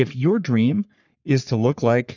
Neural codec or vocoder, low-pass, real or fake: codec, 44.1 kHz, 3.4 kbps, Pupu-Codec; 7.2 kHz; fake